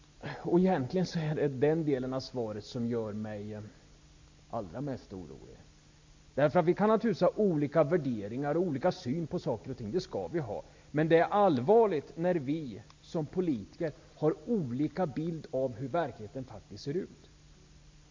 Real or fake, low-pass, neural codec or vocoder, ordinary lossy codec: real; 7.2 kHz; none; MP3, 48 kbps